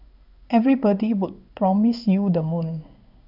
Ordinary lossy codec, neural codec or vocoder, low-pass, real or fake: none; codec, 16 kHz in and 24 kHz out, 1 kbps, XY-Tokenizer; 5.4 kHz; fake